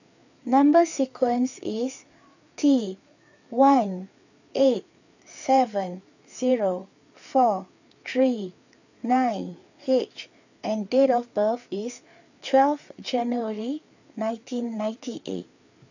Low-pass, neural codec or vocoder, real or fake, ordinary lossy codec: 7.2 kHz; codec, 16 kHz, 4 kbps, FreqCodec, larger model; fake; none